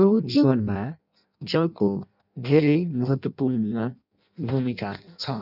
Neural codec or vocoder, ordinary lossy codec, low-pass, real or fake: codec, 16 kHz in and 24 kHz out, 0.6 kbps, FireRedTTS-2 codec; none; 5.4 kHz; fake